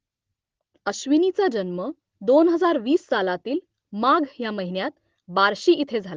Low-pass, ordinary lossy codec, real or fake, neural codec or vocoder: 7.2 kHz; Opus, 16 kbps; real; none